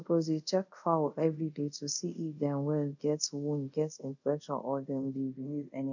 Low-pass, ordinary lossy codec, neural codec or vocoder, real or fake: 7.2 kHz; none; codec, 24 kHz, 0.5 kbps, DualCodec; fake